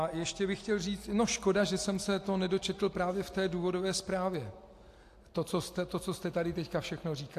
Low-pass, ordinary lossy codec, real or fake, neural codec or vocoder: 14.4 kHz; AAC, 64 kbps; real; none